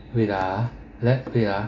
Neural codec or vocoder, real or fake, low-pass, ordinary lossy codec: none; real; 7.2 kHz; AAC, 32 kbps